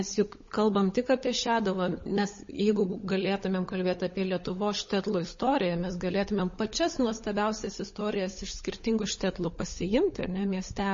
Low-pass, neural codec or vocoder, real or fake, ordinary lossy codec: 7.2 kHz; codec, 16 kHz, 16 kbps, FunCodec, trained on LibriTTS, 50 frames a second; fake; MP3, 32 kbps